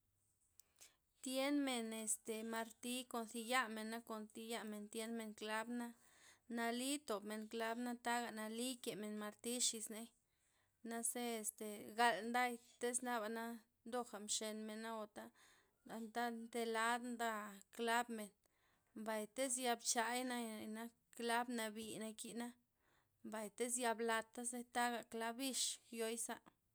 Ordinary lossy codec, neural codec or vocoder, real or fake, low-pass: none; none; real; none